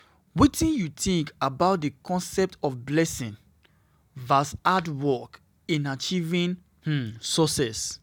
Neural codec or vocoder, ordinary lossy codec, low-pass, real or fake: none; none; 19.8 kHz; real